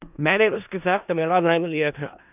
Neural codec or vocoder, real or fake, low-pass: codec, 16 kHz in and 24 kHz out, 0.4 kbps, LongCat-Audio-Codec, four codebook decoder; fake; 3.6 kHz